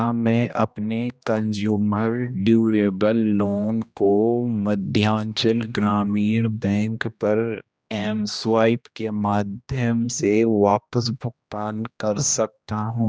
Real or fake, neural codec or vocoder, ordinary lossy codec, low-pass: fake; codec, 16 kHz, 1 kbps, X-Codec, HuBERT features, trained on general audio; none; none